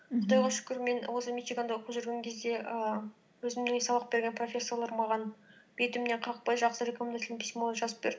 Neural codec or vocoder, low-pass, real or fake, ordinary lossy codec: none; none; real; none